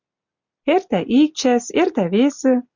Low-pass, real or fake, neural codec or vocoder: 7.2 kHz; real; none